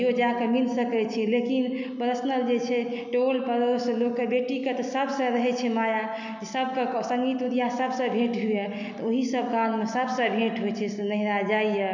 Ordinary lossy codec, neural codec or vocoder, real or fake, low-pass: none; none; real; 7.2 kHz